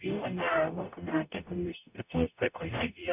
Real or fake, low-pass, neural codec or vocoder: fake; 3.6 kHz; codec, 44.1 kHz, 0.9 kbps, DAC